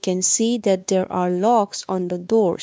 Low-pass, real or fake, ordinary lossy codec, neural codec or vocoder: none; fake; none; codec, 16 kHz, 2 kbps, X-Codec, WavLM features, trained on Multilingual LibriSpeech